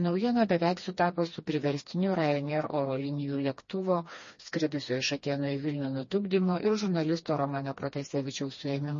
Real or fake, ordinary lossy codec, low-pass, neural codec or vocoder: fake; MP3, 32 kbps; 7.2 kHz; codec, 16 kHz, 2 kbps, FreqCodec, smaller model